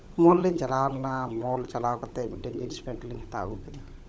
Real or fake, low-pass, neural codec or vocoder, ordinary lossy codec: fake; none; codec, 16 kHz, 16 kbps, FunCodec, trained on LibriTTS, 50 frames a second; none